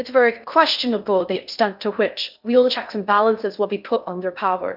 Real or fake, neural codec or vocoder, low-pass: fake; codec, 16 kHz in and 24 kHz out, 0.6 kbps, FocalCodec, streaming, 2048 codes; 5.4 kHz